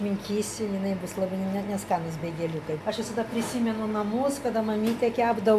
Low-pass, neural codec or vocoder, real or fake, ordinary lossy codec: 14.4 kHz; none; real; MP3, 96 kbps